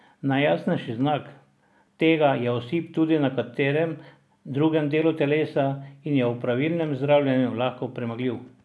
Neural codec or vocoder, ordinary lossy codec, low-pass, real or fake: none; none; none; real